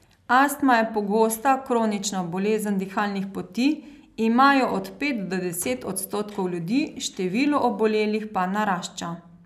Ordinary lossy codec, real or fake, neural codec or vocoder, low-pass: none; real; none; 14.4 kHz